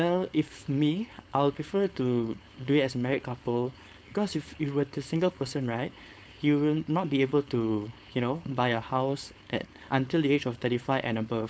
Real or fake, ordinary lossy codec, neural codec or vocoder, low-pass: fake; none; codec, 16 kHz, 4.8 kbps, FACodec; none